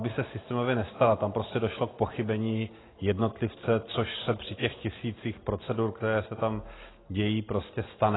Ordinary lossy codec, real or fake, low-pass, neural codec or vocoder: AAC, 16 kbps; real; 7.2 kHz; none